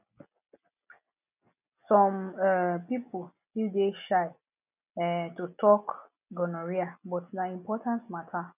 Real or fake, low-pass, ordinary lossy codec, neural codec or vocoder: real; 3.6 kHz; none; none